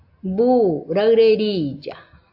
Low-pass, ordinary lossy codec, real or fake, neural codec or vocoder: 5.4 kHz; AAC, 48 kbps; real; none